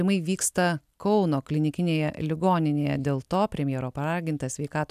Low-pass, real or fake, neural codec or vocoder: 14.4 kHz; real; none